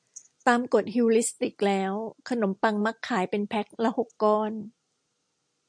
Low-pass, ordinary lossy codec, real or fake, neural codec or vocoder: 9.9 kHz; MP3, 64 kbps; real; none